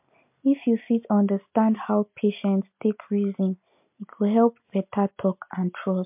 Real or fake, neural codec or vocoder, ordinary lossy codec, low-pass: real; none; MP3, 32 kbps; 3.6 kHz